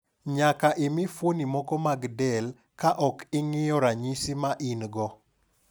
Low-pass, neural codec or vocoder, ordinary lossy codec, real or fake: none; none; none; real